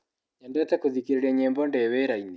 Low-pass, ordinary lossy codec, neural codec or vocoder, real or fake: none; none; none; real